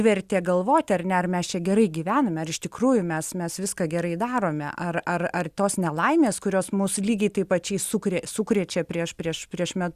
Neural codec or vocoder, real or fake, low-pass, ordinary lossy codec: none; real; 14.4 kHz; Opus, 64 kbps